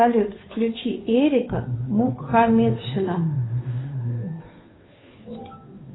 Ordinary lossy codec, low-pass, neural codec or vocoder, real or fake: AAC, 16 kbps; 7.2 kHz; codec, 16 kHz, 2 kbps, FunCodec, trained on Chinese and English, 25 frames a second; fake